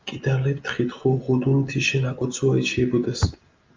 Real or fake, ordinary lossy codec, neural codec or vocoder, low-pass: real; Opus, 24 kbps; none; 7.2 kHz